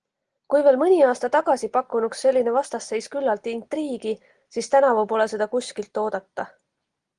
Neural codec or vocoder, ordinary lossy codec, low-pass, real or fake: none; Opus, 24 kbps; 9.9 kHz; real